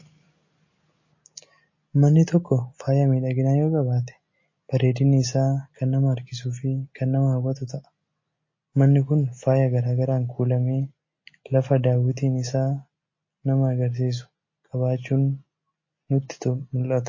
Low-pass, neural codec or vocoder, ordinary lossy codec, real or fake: 7.2 kHz; none; MP3, 32 kbps; real